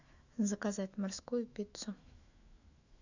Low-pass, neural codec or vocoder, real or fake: 7.2 kHz; autoencoder, 48 kHz, 128 numbers a frame, DAC-VAE, trained on Japanese speech; fake